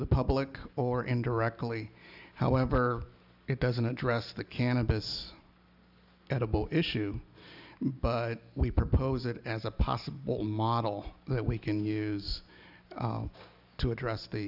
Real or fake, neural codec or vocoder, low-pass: real; none; 5.4 kHz